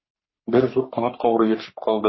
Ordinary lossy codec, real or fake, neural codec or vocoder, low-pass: MP3, 24 kbps; fake; codec, 44.1 kHz, 3.4 kbps, Pupu-Codec; 7.2 kHz